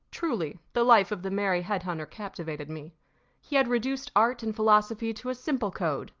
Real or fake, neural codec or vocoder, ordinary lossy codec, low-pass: real; none; Opus, 32 kbps; 7.2 kHz